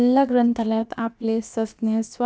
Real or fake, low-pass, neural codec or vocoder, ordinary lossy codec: fake; none; codec, 16 kHz, about 1 kbps, DyCAST, with the encoder's durations; none